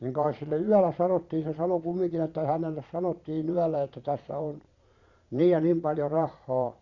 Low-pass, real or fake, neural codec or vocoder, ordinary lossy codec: 7.2 kHz; fake; vocoder, 22.05 kHz, 80 mel bands, Vocos; Opus, 64 kbps